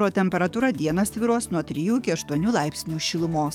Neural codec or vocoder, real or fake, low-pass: codec, 44.1 kHz, 7.8 kbps, DAC; fake; 19.8 kHz